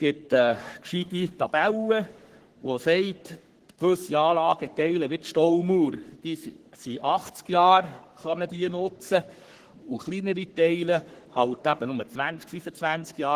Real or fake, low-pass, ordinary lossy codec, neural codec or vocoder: fake; 14.4 kHz; Opus, 16 kbps; codec, 44.1 kHz, 3.4 kbps, Pupu-Codec